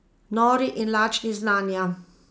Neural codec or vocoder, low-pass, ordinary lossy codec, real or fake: none; none; none; real